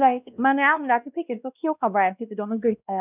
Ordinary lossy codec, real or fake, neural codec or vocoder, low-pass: none; fake; codec, 16 kHz, 1 kbps, X-Codec, WavLM features, trained on Multilingual LibriSpeech; 3.6 kHz